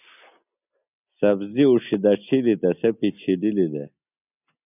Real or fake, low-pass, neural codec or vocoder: real; 3.6 kHz; none